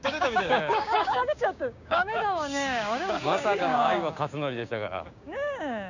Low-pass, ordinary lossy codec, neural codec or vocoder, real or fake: 7.2 kHz; none; codec, 16 kHz, 6 kbps, DAC; fake